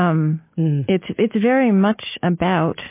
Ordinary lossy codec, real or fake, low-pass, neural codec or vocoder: MP3, 24 kbps; real; 3.6 kHz; none